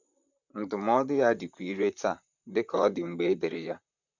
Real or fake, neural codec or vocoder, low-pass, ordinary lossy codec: fake; vocoder, 44.1 kHz, 128 mel bands, Pupu-Vocoder; 7.2 kHz; none